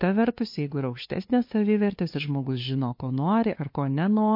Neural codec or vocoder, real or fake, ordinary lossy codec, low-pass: codec, 16 kHz, 2 kbps, FunCodec, trained on LibriTTS, 25 frames a second; fake; MP3, 32 kbps; 5.4 kHz